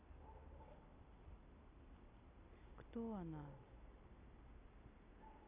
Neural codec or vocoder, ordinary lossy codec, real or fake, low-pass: none; none; real; 3.6 kHz